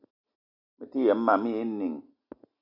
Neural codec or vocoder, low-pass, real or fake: none; 5.4 kHz; real